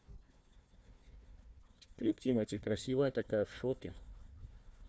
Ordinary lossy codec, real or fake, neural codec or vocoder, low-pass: none; fake; codec, 16 kHz, 1 kbps, FunCodec, trained on Chinese and English, 50 frames a second; none